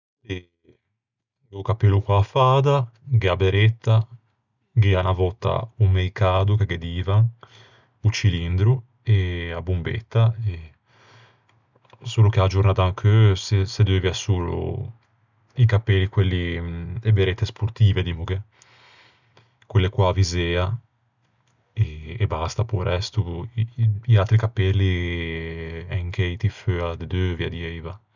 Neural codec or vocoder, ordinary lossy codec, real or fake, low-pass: none; none; real; 7.2 kHz